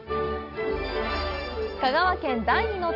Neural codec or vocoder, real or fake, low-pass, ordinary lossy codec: none; real; 5.4 kHz; none